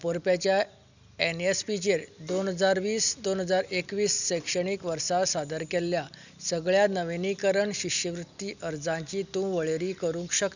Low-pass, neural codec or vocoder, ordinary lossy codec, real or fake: 7.2 kHz; none; none; real